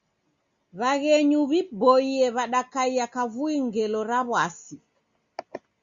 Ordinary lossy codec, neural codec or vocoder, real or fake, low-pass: Opus, 64 kbps; none; real; 7.2 kHz